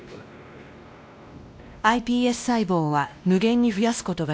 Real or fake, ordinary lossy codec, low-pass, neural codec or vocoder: fake; none; none; codec, 16 kHz, 1 kbps, X-Codec, WavLM features, trained on Multilingual LibriSpeech